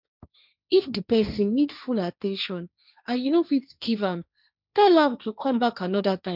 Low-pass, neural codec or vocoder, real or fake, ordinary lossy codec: 5.4 kHz; codec, 16 kHz, 1.1 kbps, Voila-Tokenizer; fake; none